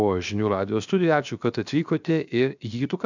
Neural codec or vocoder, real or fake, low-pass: codec, 16 kHz, 0.7 kbps, FocalCodec; fake; 7.2 kHz